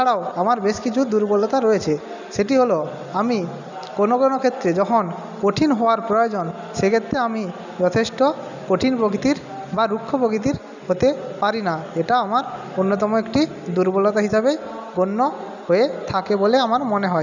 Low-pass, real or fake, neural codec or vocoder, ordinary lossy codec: 7.2 kHz; real; none; none